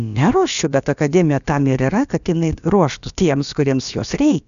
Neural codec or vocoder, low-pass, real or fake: codec, 16 kHz, about 1 kbps, DyCAST, with the encoder's durations; 7.2 kHz; fake